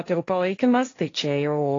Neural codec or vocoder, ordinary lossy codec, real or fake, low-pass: codec, 16 kHz, 1.1 kbps, Voila-Tokenizer; AAC, 32 kbps; fake; 7.2 kHz